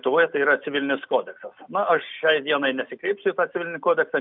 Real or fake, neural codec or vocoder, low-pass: real; none; 5.4 kHz